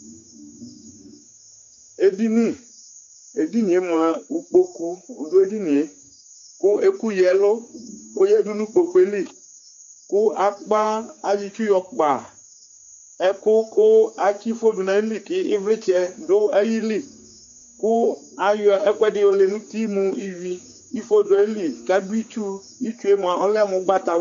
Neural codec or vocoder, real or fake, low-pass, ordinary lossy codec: codec, 16 kHz, 4 kbps, X-Codec, HuBERT features, trained on general audio; fake; 7.2 kHz; MP3, 48 kbps